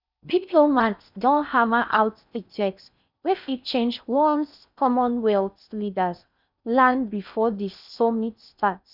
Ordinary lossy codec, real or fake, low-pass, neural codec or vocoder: none; fake; 5.4 kHz; codec, 16 kHz in and 24 kHz out, 0.6 kbps, FocalCodec, streaming, 4096 codes